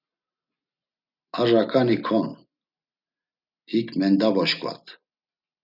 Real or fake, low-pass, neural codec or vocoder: real; 5.4 kHz; none